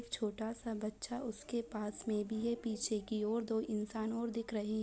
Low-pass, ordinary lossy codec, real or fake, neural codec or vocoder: none; none; real; none